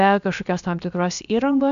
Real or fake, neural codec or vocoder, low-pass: fake; codec, 16 kHz, about 1 kbps, DyCAST, with the encoder's durations; 7.2 kHz